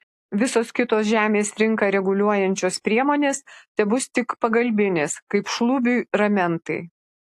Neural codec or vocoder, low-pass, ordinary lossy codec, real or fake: none; 14.4 kHz; AAC, 64 kbps; real